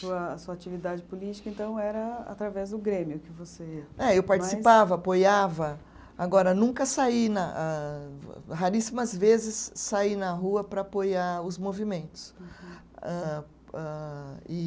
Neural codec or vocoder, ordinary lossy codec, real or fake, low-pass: none; none; real; none